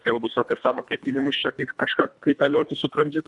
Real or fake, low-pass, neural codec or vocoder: fake; 10.8 kHz; codec, 24 kHz, 1.5 kbps, HILCodec